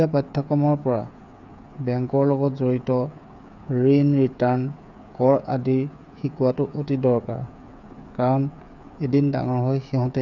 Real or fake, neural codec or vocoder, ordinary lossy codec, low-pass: fake; codec, 16 kHz, 8 kbps, FreqCodec, smaller model; none; 7.2 kHz